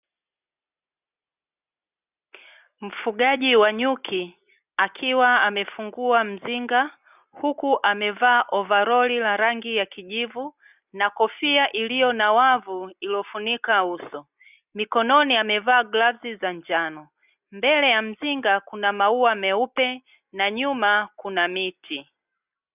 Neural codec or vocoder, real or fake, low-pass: none; real; 3.6 kHz